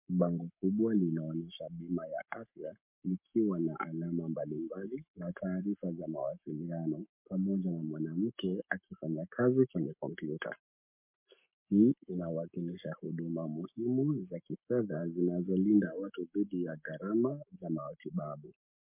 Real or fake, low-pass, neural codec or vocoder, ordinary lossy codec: fake; 3.6 kHz; codec, 44.1 kHz, 7.8 kbps, Pupu-Codec; MP3, 32 kbps